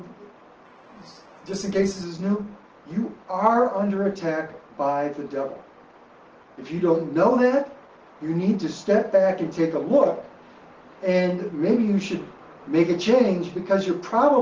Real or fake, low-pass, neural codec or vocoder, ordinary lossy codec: real; 7.2 kHz; none; Opus, 16 kbps